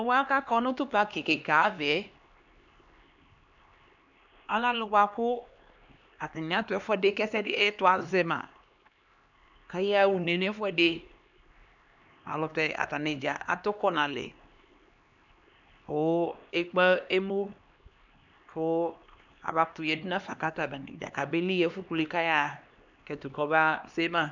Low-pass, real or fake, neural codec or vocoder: 7.2 kHz; fake; codec, 16 kHz, 2 kbps, X-Codec, HuBERT features, trained on LibriSpeech